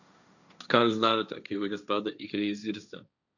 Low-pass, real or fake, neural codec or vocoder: 7.2 kHz; fake; codec, 16 kHz, 1.1 kbps, Voila-Tokenizer